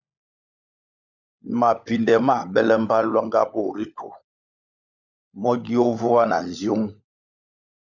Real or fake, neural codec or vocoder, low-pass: fake; codec, 16 kHz, 16 kbps, FunCodec, trained on LibriTTS, 50 frames a second; 7.2 kHz